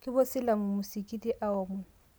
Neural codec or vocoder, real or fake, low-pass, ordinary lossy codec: none; real; none; none